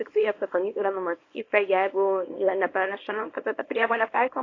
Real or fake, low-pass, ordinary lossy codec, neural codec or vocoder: fake; 7.2 kHz; AAC, 32 kbps; codec, 24 kHz, 0.9 kbps, WavTokenizer, small release